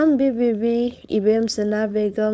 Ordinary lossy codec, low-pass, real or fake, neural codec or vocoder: none; none; fake; codec, 16 kHz, 4.8 kbps, FACodec